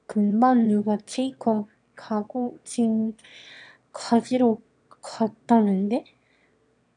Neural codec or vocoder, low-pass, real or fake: autoencoder, 22.05 kHz, a latent of 192 numbers a frame, VITS, trained on one speaker; 9.9 kHz; fake